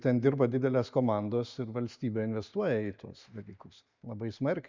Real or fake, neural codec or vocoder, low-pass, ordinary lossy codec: real; none; 7.2 kHz; MP3, 64 kbps